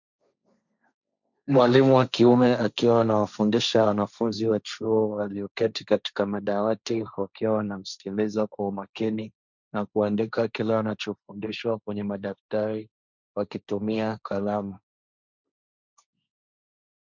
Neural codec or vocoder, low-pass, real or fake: codec, 16 kHz, 1.1 kbps, Voila-Tokenizer; 7.2 kHz; fake